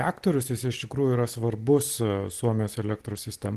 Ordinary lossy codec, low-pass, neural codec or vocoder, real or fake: Opus, 16 kbps; 14.4 kHz; none; real